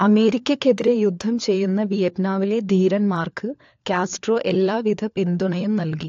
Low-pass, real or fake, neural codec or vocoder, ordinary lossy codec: 7.2 kHz; fake; codec, 16 kHz, 4 kbps, FunCodec, trained on LibriTTS, 50 frames a second; AAC, 48 kbps